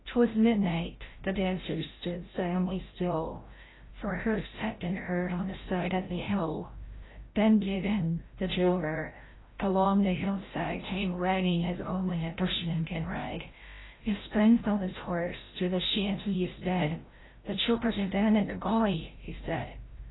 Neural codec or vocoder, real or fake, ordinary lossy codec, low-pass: codec, 16 kHz, 0.5 kbps, FreqCodec, larger model; fake; AAC, 16 kbps; 7.2 kHz